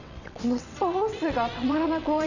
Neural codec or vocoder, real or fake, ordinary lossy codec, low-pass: vocoder, 22.05 kHz, 80 mel bands, WaveNeXt; fake; none; 7.2 kHz